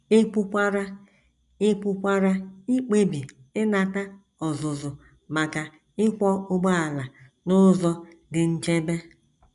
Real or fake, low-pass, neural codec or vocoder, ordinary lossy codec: real; 10.8 kHz; none; none